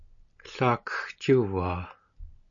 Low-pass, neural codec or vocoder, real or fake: 7.2 kHz; none; real